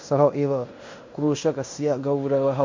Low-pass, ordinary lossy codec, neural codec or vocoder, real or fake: 7.2 kHz; MP3, 48 kbps; codec, 16 kHz in and 24 kHz out, 0.9 kbps, LongCat-Audio-Codec, fine tuned four codebook decoder; fake